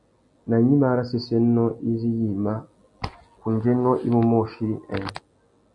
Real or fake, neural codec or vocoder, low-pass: real; none; 10.8 kHz